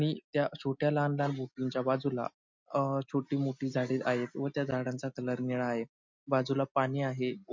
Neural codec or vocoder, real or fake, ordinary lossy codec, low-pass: none; real; MP3, 48 kbps; 7.2 kHz